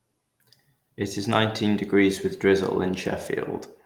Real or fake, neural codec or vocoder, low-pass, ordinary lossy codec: real; none; 19.8 kHz; Opus, 24 kbps